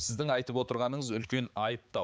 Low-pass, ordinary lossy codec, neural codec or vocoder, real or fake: none; none; codec, 16 kHz, 4 kbps, X-Codec, HuBERT features, trained on balanced general audio; fake